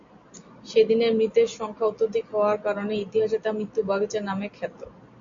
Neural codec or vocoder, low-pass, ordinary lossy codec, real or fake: none; 7.2 kHz; MP3, 48 kbps; real